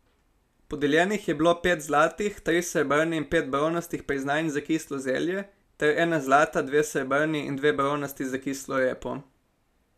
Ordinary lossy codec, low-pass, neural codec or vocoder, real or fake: none; 14.4 kHz; none; real